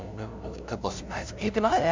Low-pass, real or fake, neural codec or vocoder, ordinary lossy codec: 7.2 kHz; fake; codec, 16 kHz, 0.5 kbps, FunCodec, trained on LibriTTS, 25 frames a second; MP3, 64 kbps